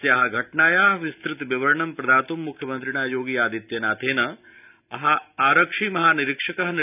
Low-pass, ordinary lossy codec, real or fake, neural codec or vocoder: 3.6 kHz; none; real; none